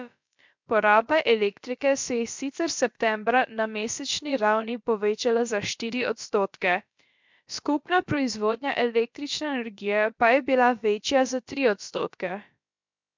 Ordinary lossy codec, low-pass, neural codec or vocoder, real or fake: MP3, 64 kbps; 7.2 kHz; codec, 16 kHz, about 1 kbps, DyCAST, with the encoder's durations; fake